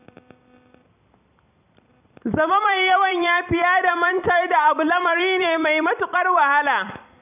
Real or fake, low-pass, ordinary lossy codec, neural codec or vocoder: fake; 3.6 kHz; none; vocoder, 44.1 kHz, 128 mel bands every 256 samples, BigVGAN v2